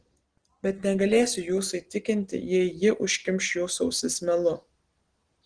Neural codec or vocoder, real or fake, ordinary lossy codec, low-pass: none; real; Opus, 16 kbps; 9.9 kHz